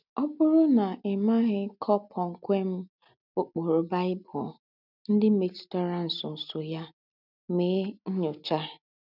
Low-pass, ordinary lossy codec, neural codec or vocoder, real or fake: 5.4 kHz; none; none; real